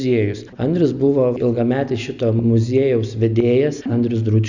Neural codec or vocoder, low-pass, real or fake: none; 7.2 kHz; real